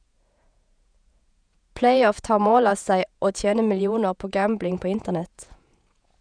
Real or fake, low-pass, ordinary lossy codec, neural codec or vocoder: fake; 9.9 kHz; none; vocoder, 48 kHz, 128 mel bands, Vocos